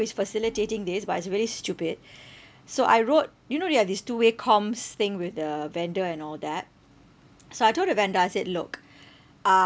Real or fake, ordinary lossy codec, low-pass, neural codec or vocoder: real; none; none; none